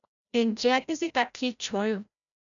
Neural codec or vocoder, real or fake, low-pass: codec, 16 kHz, 0.5 kbps, FreqCodec, larger model; fake; 7.2 kHz